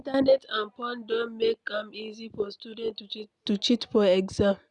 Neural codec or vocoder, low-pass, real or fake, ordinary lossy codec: none; 10.8 kHz; real; none